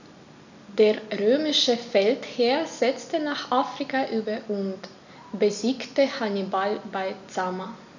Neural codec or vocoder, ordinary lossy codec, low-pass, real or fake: none; none; 7.2 kHz; real